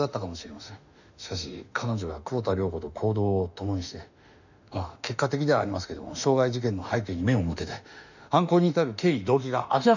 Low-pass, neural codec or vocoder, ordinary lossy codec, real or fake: 7.2 kHz; autoencoder, 48 kHz, 32 numbers a frame, DAC-VAE, trained on Japanese speech; none; fake